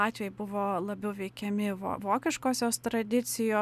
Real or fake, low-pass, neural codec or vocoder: real; 14.4 kHz; none